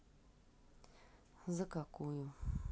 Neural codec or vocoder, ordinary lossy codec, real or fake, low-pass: none; none; real; none